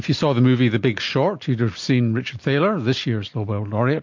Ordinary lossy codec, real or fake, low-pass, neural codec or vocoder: MP3, 48 kbps; real; 7.2 kHz; none